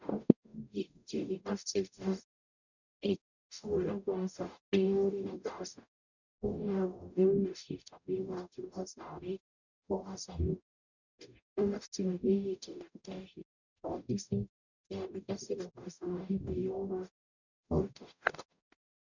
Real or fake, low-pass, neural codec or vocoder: fake; 7.2 kHz; codec, 44.1 kHz, 0.9 kbps, DAC